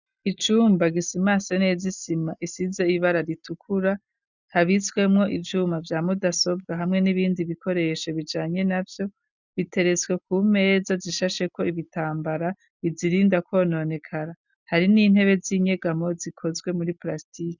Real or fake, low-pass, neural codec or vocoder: real; 7.2 kHz; none